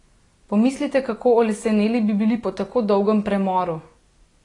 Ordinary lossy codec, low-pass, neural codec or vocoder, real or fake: AAC, 32 kbps; 10.8 kHz; none; real